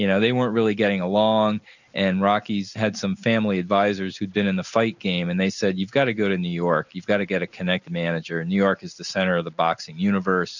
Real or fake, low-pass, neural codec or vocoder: real; 7.2 kHz; none